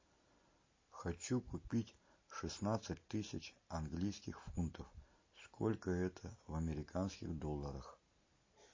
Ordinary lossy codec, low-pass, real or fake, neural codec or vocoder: MP3, 32 kbps; 7.2 kHz; real; none